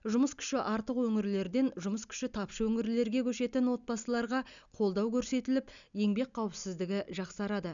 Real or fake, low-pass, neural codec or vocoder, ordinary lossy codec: real; 7.2 kHz; none; none